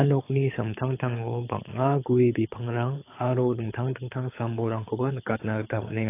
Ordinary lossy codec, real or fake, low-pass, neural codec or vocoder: AAC, 24 kbps; fake; 3.6 kHz; codec, 24 kHz, 6 kbps, HILCodec